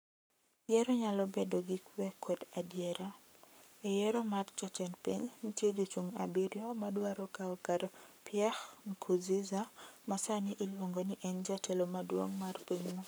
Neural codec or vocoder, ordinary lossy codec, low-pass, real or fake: codec, 44.1 kHz, 7.8 kbps, Pupu-Codec; none; none; fake